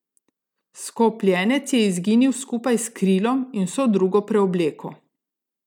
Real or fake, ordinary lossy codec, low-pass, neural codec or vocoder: real; none; 19.8 kHz; none